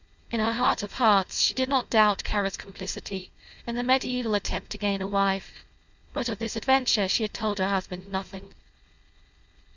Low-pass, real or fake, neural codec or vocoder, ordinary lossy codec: 7.2 kHz; fake; codec, 16 kHz, 4.8 kbps, FACodec; Opus, 64 kbps